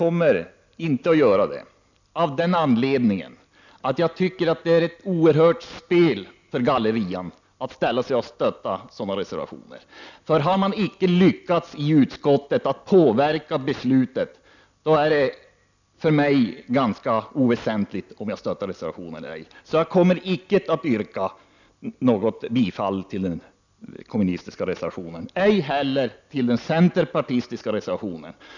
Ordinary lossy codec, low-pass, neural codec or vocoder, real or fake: none; 7.2 kHz; none; real